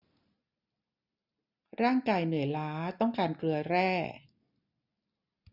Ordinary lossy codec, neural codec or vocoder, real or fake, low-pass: none; none; real; 5.4 kHz